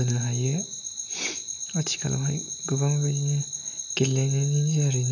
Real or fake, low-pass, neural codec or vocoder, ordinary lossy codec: real; 7.2 kHz; none; none